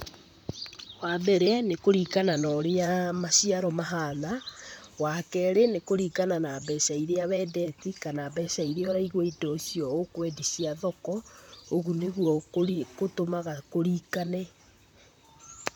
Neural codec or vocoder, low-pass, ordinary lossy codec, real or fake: vocoder, 44.1 kHz, 128 mel bands, Pupu-Vocoder; none; none; fake